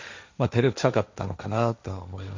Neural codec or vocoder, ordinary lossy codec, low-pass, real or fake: codec, 16 kHz, 1.1 kbps, Voila-Tokenizer; MP3, 64 kbps; 7.2 kHz; fake